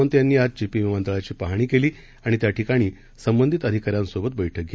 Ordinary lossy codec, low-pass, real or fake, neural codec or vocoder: none; none; real; none